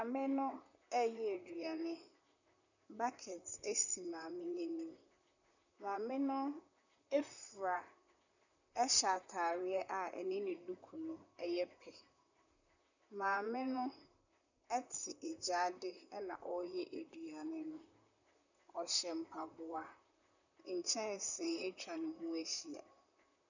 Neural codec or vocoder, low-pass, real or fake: vocoder, 44.1 kHz, 128 mel bands, Pupu-Vocoder; 7.2 kHz; fake